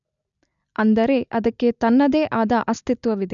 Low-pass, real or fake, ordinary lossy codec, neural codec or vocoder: 7.2 kHz; real; none; none